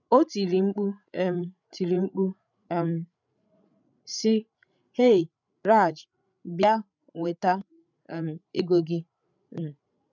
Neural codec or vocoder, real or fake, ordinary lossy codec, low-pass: codec, 16 kHz, 16 kbps, FreqCodec, larger model; fake; none; 7.2 kHz